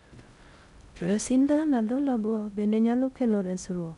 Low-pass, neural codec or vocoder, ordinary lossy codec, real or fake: 10.8 kHz; codec, 16 kHz in and 24 kHz out, 0.6 kbps, FocalCodec, streaming, 4096 codes; none; fake